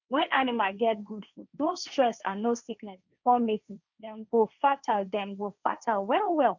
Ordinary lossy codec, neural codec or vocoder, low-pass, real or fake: none; codec, 16 kHz, 1.1 kbps, Voila-Tokenizer; 7.2 kHz; fake